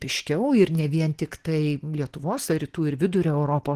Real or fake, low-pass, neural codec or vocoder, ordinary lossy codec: real; 14.4 kHz; none; Opus, 16 kbps